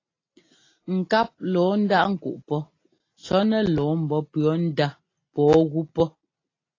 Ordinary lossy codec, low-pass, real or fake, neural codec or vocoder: AAC, 32 kbps; 7.2 kHz; real; none